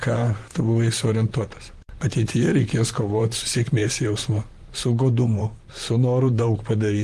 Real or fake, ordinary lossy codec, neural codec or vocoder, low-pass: fake; Opus, 24 kbps; vocoder, 44.1 kHz, 128 mel bands, Pupu-Vocoder; 14.4 kHz